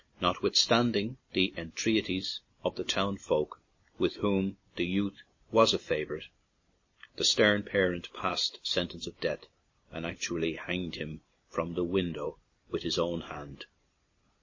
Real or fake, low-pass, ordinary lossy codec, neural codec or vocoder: real; 7.2 kHz; MP3, 32 kbps; none